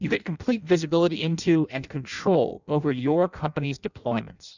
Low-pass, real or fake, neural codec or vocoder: 7.2 kHz; fake; codec, 16 kHz in and 24 kHz out, 0.6 kbps, FireRedTTS-2 codec